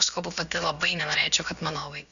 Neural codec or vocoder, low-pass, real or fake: codec, 16 kHz, about 1 kbps, DyCAST, with the encoder's durations; 7.2 kHz; fake